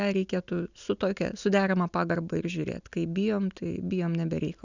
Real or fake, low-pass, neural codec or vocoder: real; 7.2 kHz; none